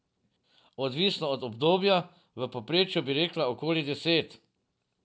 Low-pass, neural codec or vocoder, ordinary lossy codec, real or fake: none; none; none; real